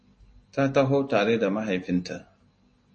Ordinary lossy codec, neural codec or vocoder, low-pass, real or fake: MP3, 32 kbps; none; 10.8 kHz; real